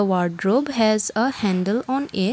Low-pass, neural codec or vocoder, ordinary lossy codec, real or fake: none; none; none; real